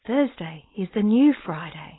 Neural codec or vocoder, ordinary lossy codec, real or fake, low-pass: codec, 16 kHz, 4.8 kbps, FACodec; AAC, 16 kbps; fake; 7.2 kHz